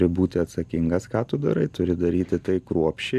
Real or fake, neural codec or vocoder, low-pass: real; none; 14.4 kHz